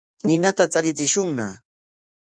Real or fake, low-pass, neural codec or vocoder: fake; 9.9 kHz; codec, 16 kHz in and 24 kHz out, 1.1 kbps, FireRedTTS-2 codec